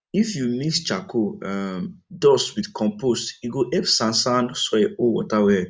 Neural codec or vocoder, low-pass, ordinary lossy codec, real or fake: none; none; none; real